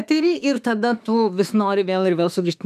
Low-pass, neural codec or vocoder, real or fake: 14.4 kHz; autoencoder, 48 kHz, 32 numbers a frame, DAC-VAE, trained on Japanese speech; fake